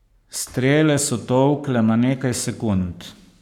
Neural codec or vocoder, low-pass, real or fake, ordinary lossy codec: codec, 44.1 kHz, 7.8 kbps, Pupu-Codec; 19.8 kHz; fake; none